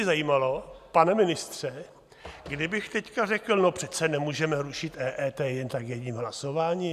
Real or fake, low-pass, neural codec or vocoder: real; 14.4 kHz; none